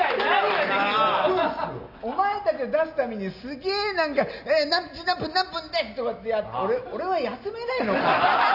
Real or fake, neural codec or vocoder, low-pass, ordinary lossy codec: real; none; 5.4 kHz; none